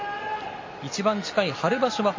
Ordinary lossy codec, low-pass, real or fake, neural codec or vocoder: MP3, 32 kbps; 7.2 kHz; real; none